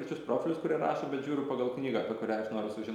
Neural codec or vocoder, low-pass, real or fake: none; 19.8 kHz; real